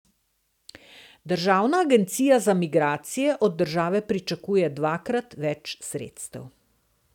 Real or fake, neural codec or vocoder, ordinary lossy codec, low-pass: real; none; none; 19.8 kHz